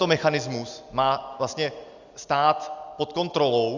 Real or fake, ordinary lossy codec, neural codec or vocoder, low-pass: real; Opus, 64 kbps; none; 7.2 kHz